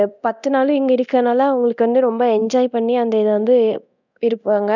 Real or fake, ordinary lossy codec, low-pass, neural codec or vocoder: fake; none; 7.2 kHz; codec, 24 kHz, 0.9 kbps, DualCodec